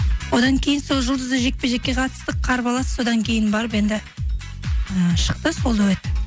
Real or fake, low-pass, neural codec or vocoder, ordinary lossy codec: real; none; none; none